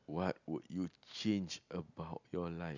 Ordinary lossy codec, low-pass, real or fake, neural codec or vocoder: none; 7.2 kHz; real; none